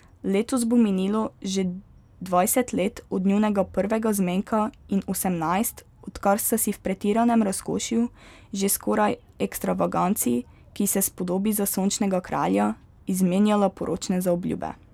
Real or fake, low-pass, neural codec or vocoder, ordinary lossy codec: real; 19.8 kHz; none; none